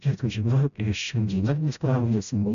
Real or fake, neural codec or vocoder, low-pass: fake; codec, 16 kHz, 0.5 kbps, FreqCodec, smaller model; 7.2 kHz